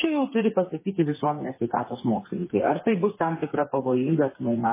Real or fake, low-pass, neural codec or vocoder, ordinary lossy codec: fake; 3.6 kHz; codec, 16 kHz, 4 kbps, FreqCodec, smaller model; MP3, 16 kbps